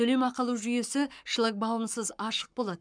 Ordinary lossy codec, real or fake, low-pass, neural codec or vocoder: none; fake; 9.9 kHz; codec, 44.1 kHz, 7.8 kbps, Pupu-Codec